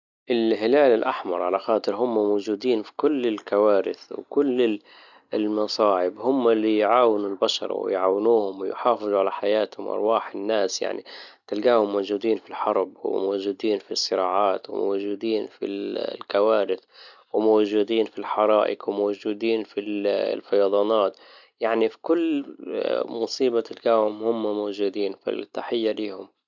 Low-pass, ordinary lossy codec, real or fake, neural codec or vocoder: 7.2 kHz; none; real; none